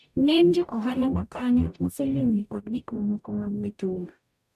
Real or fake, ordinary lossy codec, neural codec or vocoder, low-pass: fake; none; codec, 44.1 kHz, 0.9 kbps, DAC; 14.4 kHz